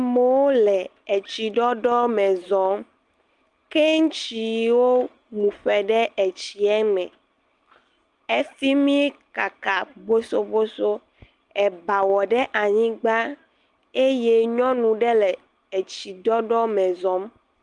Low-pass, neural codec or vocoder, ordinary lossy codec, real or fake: 10.8 kHz; none; Opus, 32 kbps; real